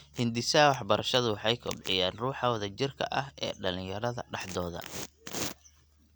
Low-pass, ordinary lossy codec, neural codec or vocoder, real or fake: none; none; none; real